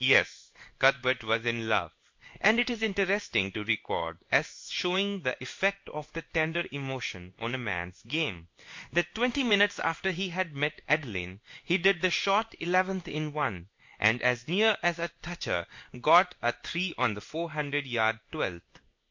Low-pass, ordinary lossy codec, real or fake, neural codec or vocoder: 7.2 kHz; MP3, 48 kbps; fake; codec, 16 kHz in and 24 kHz out, 1 kbps, XY-Tokenizer